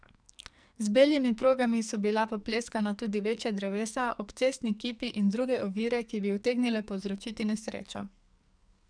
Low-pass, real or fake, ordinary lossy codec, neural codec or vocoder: 9.9 kHz; fake; none; codec, 44.1 kHz, 2.6 kbps, SNAC